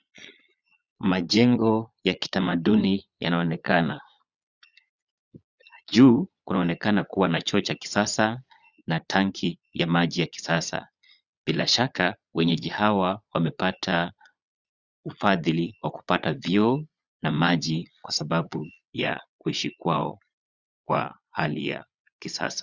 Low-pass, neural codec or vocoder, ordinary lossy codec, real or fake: 7.2 kHz; vocoder, 22.05 kHz, 80 mel bands, Vocos; Opus, 64 kbps; fake